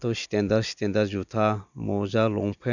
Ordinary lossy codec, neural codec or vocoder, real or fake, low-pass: none; none; real; 7.2 kHz